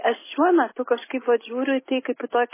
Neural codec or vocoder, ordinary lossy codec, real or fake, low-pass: none; MP3, 16 kbps; real; 3.6 kHz